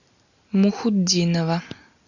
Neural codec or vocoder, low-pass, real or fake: none; 7.2 kHz; real